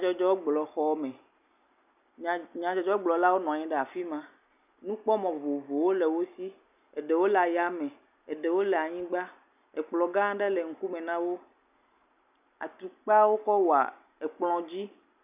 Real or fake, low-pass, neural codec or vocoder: real; 3.6 kHz; none